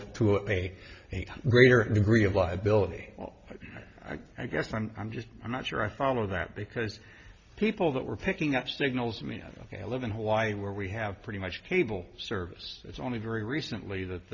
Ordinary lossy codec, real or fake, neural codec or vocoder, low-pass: Opus, 64 kbps; real; none; 7.2 kHz